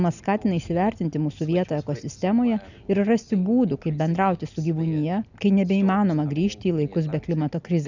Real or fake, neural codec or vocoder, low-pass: real; none; 7.2 kHz